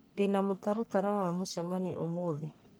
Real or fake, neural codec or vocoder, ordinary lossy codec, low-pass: fake; codec, 44.1 kHz, 1.7 kbps, Pupu-Codec; none; none